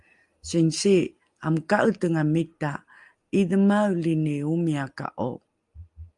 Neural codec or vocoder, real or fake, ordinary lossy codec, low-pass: none; real; Opus, 32 kbps; 10.8 kHz